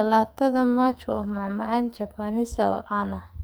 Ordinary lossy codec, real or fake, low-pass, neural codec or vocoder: none; fake; none; codec, 44.1 kHz, 2.6 kbps, SNAC